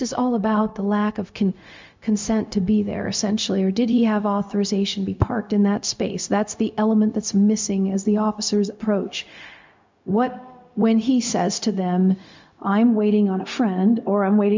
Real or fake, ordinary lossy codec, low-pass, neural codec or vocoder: fake; MP3, 64 kbps; 7.2 kHz; codec, 16 kHz, 0.4 kbps, LongCat-Audio-Codec